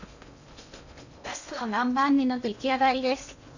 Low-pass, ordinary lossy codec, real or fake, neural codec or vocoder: 7.2 kHz; none; fake; codec, 16 kHz in and 24 kHz out, 0.6 kbps, FocalCodec, streaming, 2048 codes